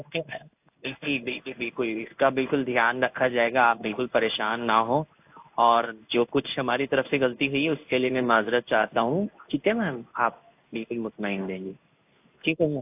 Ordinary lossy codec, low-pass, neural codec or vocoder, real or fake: AAC, 32 kbps; 3.6 kHz; codec, 16 kHz in and 24 kHz out, 1 kbps, XY-Tokenizer; fake